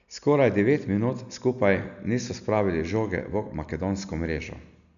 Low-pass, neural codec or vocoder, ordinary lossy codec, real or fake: 7.2 kHz; none; none; real